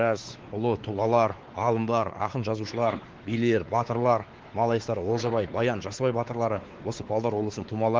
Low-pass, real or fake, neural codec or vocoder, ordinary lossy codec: 7.2 kHz; fake; codec, 16 kHz, 4 kbps, X-Codec, WavLM features, trained on Multilingual LibriSpeech; Opus, 16 kbps